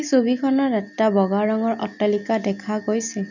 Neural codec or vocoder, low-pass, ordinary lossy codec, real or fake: none; 7.2 kHz; none; real